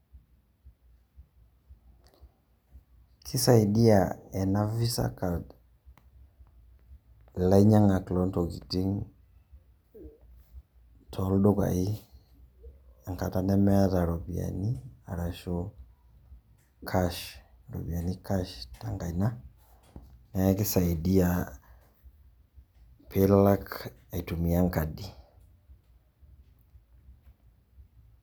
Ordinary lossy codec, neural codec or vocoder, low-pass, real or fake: none; none; none; real